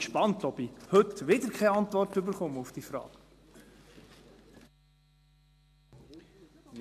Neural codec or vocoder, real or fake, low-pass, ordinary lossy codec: none; real; 14.4 kHz; MP3, 96 kbps